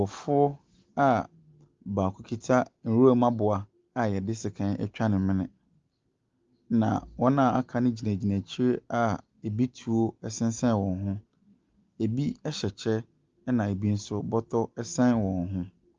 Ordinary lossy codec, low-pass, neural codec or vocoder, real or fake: Opus, 32 kbps; 7.2 kHz; none; real